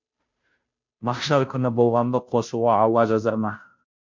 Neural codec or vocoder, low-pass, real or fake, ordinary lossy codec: codec, 16 kHz, 0.5 kbps, FunCodec, trained on Chinese and English, 25 frames a second; 7.2 kHz; fake; MP3, 48 kbps